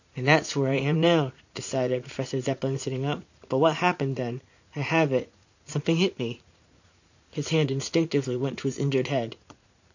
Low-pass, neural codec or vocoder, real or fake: 7.2 kHz; vocoder, 44.1 kHz, 80 mel bands, Vocos; fake